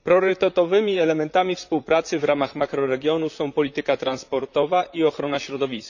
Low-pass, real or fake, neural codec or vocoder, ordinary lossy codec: 7.2 kHz; fake; vocoder, 44.1 kHz, 128 mel bands, Pupu-Vocoder; none